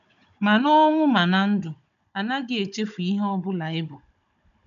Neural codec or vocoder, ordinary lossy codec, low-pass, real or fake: codec, 16 kHz, 16 kbps, FunCodec, trained on Chinese and English, 50 frames a second; none; 7.2 kHz; fake